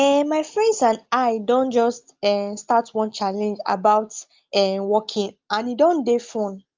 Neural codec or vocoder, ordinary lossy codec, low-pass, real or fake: none; Opus, 32 kbps; 7.2 kHz; real